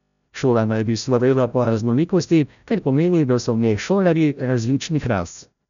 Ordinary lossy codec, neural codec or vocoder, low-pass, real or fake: none; codec, 16 kHz, 0.5 kbps, FreqCodec, larger model; 7.2 kHz; fake